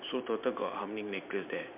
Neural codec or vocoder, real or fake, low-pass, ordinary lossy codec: vocoder, 44.1 kHz, 128 mel bands every 512 samples, BigVGAN v2; fake; 3.6 kHz; MP3, 32 kbps